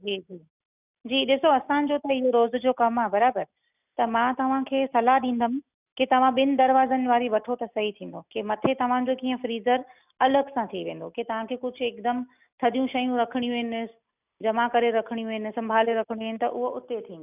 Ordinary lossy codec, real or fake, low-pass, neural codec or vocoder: none; real; 3.6 kHz; none